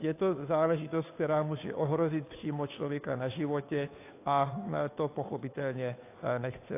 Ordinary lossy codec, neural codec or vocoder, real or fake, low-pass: AAC, 24 kbps; codec, 16 kHz, 2 kbps, FunCodec, trained on Chinese and English, 25 frames a second; fake; 3.6 kHz